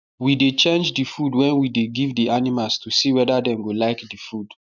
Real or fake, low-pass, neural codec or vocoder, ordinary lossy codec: real; 7.2 kHz; none; none